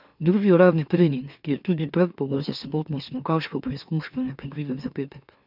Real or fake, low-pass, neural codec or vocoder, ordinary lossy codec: fake; 5.4 kHz; autoencoder, 44.1 kHz, a latent of 192 numbers a frame, MeloTTS; none